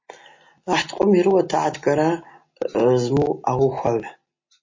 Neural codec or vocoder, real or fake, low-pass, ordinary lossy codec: none; real; 7.2 kHz; MP3, 32 kbps